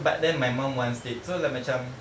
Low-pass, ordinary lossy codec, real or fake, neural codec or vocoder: none; none; real; none